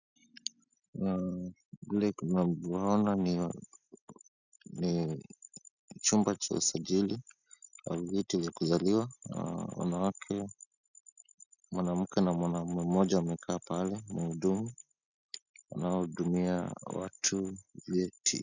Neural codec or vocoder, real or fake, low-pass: none; real; 7.2 kHz